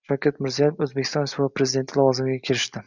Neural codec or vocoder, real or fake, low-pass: none; real; 7.2 kHz